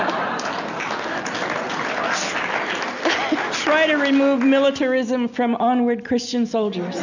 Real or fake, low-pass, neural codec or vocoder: real; 7.2 kHz; none